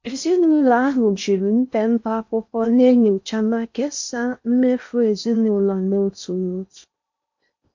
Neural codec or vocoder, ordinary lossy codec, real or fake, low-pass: codec, 16 kHz in and 24 kHz out, 0.6 kbps, FocalCodec, streaming, 4096 codes; MP3, 48 kbps; fake; 7.2 kHz